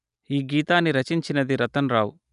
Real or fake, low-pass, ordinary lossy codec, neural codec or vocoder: real; 10.8 kHz; none; none